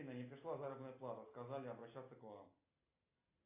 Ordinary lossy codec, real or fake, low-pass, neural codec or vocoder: AAC, 24 kbps; real; 3.6 kHz; none